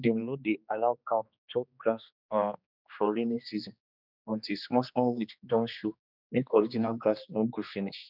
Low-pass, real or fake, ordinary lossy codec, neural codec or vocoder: 5.4 kHz; fake; none; codec, 16 kHz, 2 kbps, X-Codec, HuBERT features, trained on general audio